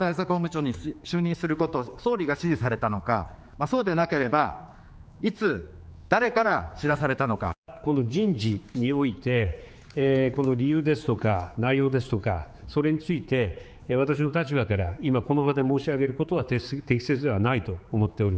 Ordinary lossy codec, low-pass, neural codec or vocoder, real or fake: none; none; codec, 16 kHz, 4 kbps, X-Codec, HuBERT features, trained on general audio; fake